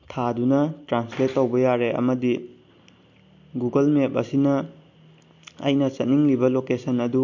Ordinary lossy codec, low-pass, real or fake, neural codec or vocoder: MP3, 48 kbps; 7.2 kHz; real; none